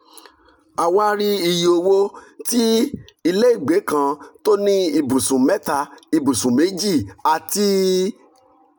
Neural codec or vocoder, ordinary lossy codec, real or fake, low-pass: none; none; real; none